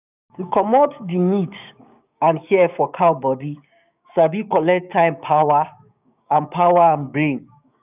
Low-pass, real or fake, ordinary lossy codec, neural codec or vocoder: 3.6 kHz; fake; none; codec, 16 kHz, 6 kbps, DAC